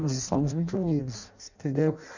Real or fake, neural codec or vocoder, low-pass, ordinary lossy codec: fake; codec, 16 kHz in and 24 kHz out, 0.6 kbps, FireRedTTS-2 codec; 7.2 kHz; none